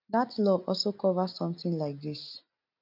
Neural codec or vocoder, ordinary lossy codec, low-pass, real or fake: none; none; 5.4 kHz; real